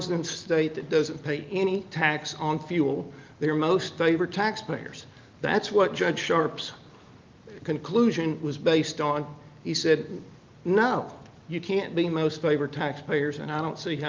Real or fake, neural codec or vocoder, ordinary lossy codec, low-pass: real; none; Opus, 32 kbps; 7.2 kHz